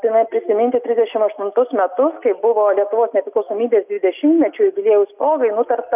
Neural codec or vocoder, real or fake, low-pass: none; real; 3.6 kHz